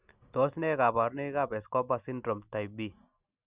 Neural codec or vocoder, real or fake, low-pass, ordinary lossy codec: none; real; 3.6 kHz; Opus, 64 kbps